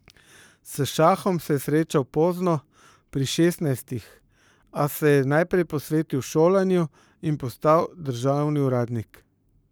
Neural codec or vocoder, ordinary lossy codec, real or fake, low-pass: codec, 44.1 kHz, 7.8 kbps, Pupu-Codec; none; fake; none